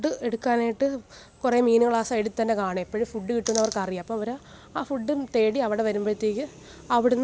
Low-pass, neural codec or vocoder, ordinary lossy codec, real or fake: none; none; none; real